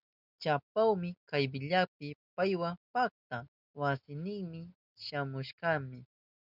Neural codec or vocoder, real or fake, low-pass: none; real; 5.4 kHz